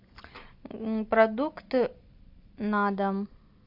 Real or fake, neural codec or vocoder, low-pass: real; none; 5.4 kHz